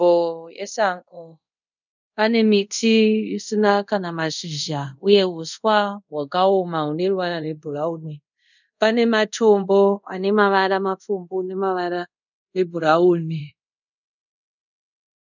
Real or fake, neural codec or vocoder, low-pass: fake; codec, 24 kHz, 0.5 kbps, DualCodec; 7.2 kHz